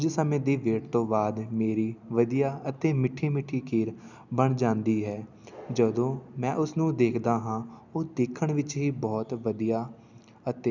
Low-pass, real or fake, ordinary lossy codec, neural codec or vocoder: 7.2 kHz; real; none; none